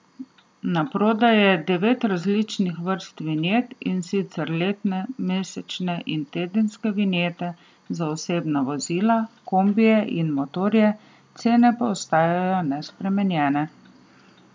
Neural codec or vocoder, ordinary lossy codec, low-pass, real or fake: none; none; none; real